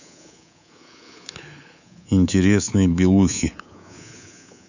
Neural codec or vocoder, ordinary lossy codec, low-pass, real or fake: codec, 24 kHz, 3.1 kbps, DualCodec; none; 7.2 kHz; fake